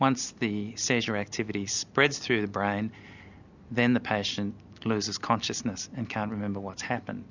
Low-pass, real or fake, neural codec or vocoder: 7.2 kHz; real; none